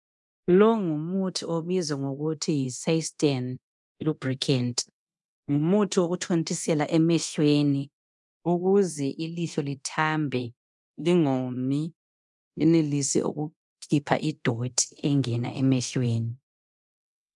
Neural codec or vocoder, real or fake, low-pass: codec, 24 kHz, 0.9 kbps, DualCodec; fake; 10.8 kHz